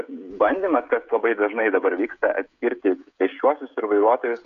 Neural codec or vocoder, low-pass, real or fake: codec, 16 kHz, 16 kbps, FreqCodec, smaller model; 7.2 kHz; fake